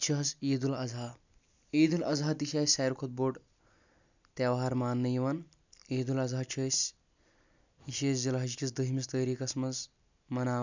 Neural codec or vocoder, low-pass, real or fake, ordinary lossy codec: none; 7.2 kHz; real; none